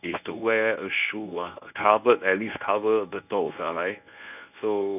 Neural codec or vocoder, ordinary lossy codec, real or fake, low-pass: codec, 24 kHz, 0.9 kbps, WavTokenizer, medium speech release version 1; none; fake; 3.6 kHz